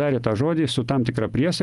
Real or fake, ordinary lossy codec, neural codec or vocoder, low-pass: real; Opus, 32 kbps; none; 10.8 kHz